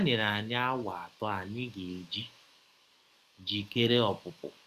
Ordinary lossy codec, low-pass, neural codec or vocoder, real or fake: Opus, 64 kbps; 14.4 kHz; codec, 44.1 kHz, 7.8 kbps, DAC; fake